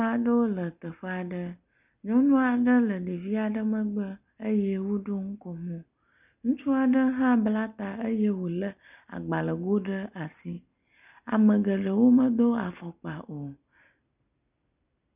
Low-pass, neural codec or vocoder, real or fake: 3.6 kHz; none; real